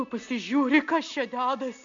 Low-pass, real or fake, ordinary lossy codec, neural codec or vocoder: 7.2 kHz; real; MP3, 64 kbps; none